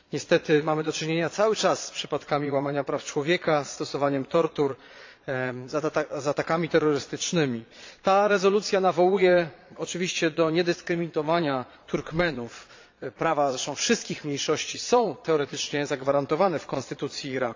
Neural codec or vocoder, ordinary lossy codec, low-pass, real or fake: vocoder, 22.05 kHz, 80 mel bands, Vocos; AAC, 48 kbps; 7.2 kHz; fake